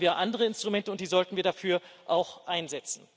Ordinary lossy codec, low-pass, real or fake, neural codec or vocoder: none; none; real; none